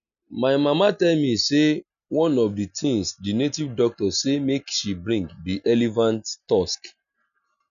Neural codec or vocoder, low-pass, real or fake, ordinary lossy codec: none; 7.2 kHz; real; none